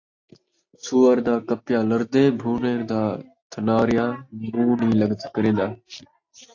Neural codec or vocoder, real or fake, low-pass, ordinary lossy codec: none; real; 7.2 kHz; AAC, 48 kbps